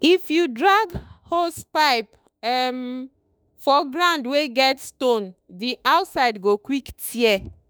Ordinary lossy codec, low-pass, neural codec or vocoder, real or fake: none; none; autoencoder, 48 kHz, 32 numbers a frame, DAC-VAE, trained on Japanese speech; fake